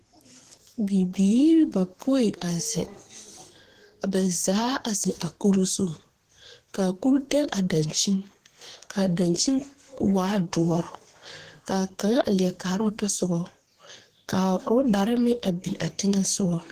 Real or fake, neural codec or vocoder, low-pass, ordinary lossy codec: fake; codec, 32 kHz, 1.9 kbps, SNAC; 14.4 kHz; Opus, 16 kbps